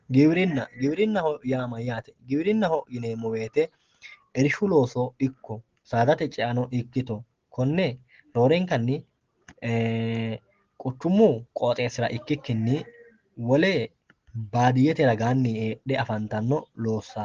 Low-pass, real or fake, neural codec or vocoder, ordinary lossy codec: 7.2 kHz; real; none; Opus, 16 kbps